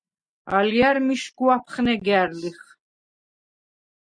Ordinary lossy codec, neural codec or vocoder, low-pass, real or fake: MP3, 96 kbps; none; 9.9 kHz; real